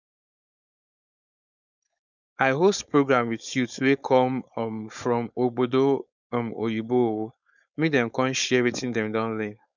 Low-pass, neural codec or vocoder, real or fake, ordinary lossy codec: 7.2 kHz; codec, 16 kHz, 4.8 kbps, FACodec; fake; none